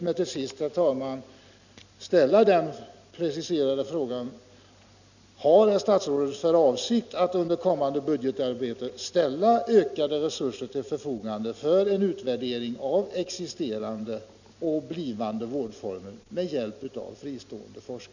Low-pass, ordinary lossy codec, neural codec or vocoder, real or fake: 7.2 kHz; none; none; real